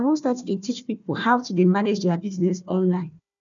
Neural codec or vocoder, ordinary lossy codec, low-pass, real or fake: codec, 16 kHz, 1 kbps, FunCodec, trained on Chinese and English, 50 frames a second; none; 7.2 kHz; fake